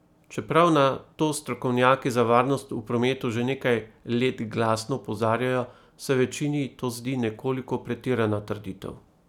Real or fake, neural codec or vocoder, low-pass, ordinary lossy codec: real; none; 19.8 kHz; none